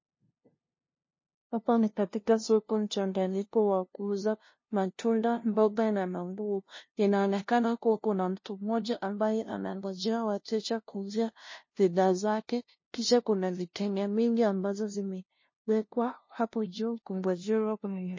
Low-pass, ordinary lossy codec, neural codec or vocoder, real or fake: 7.2 kHz; MP3, 32 kbps; codec, 16 kHz, 0.5 kbps, FunCodec, trained on LibriTTS, 25 frames a second; fake